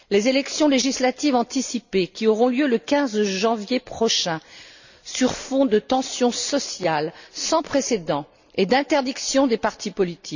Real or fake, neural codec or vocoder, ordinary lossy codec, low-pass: real; none; none; 7.2 kHz